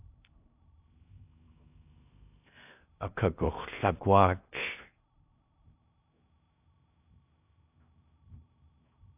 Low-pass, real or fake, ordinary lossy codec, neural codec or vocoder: 3.6 kHz; fake; AAC, 32 kbps; codec, 16 kHz in and 24 kHz out, 0.6 kbps, FocalCodec, streaming, 2048 codes